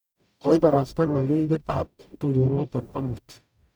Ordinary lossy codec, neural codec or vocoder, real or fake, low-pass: none; codec, 44.1 kHz, 0.9 kbps, DAC; fake; none